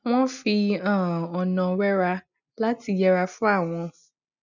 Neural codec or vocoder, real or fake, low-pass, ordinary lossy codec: none; real; 7.2 kHz; none